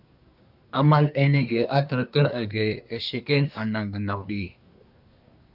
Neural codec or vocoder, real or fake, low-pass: codec, 24 kHz, 1 kbps, SNAC; fake; 5.4 kHz